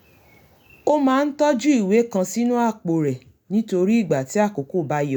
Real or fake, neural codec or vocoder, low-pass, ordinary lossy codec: real; none; 19.8 kHz; none